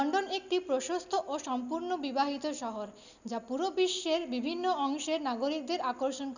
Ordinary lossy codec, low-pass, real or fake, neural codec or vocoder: none; 7.2 kHz; real; none